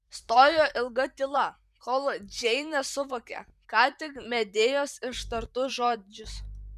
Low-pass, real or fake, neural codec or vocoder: 14.4 kHz; fake; vocoder, 44.1 kHz, 128 mel bands, Pupu-Vocoder